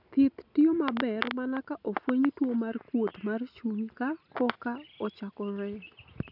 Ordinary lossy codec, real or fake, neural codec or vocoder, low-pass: none; real; none; 5.4 kHz